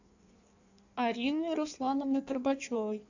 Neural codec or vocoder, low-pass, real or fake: codec, 16 kHz in and 24 kHz out, 1.1 kbps, FireRedTTS-2 codec; 7.2 kHz; fake